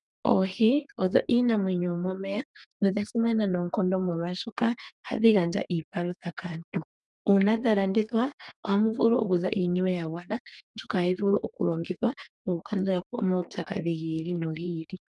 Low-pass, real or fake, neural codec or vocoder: 10.8 kHz; fake; codec, 32 kHz, 1.9 kbps, SNAC